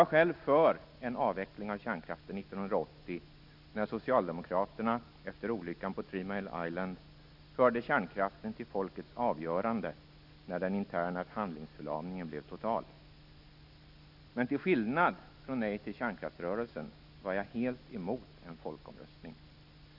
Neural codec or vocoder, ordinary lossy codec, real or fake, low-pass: none; none; real; 5.4 kHz